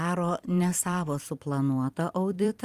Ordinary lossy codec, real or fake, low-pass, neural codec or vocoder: Opus, 32 kbps; fake; 14.4 kHz; vocoder, 44.1 kHz, 128 mel bands every 256 samples, BigVGAN v2